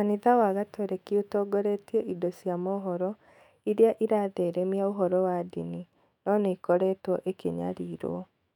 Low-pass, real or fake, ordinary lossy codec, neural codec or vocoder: 19.8 kHz; fake; none; autoencoder, 48 kHz, 128 numbers a frame, DAC-VAE, trained on Japanese speech